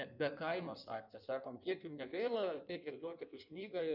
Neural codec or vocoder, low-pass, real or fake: codec, 16 kHz in and 24 kHz out, 1.1 kbps, FireRedTTS-2 codec; 5.4 kHz; fake